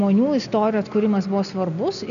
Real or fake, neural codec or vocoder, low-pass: real; none; 7.2 kHz